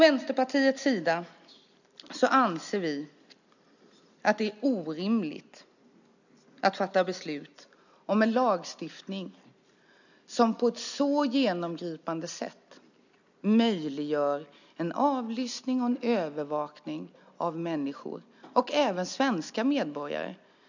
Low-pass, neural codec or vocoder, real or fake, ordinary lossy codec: 7.2 kHz; none; real; none